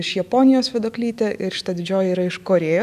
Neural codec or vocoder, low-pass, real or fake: autoencoder, 48 kHz, 128 numbers a frame, DAC-VAE, trained on Japanese speech; 14.4 kHz; fake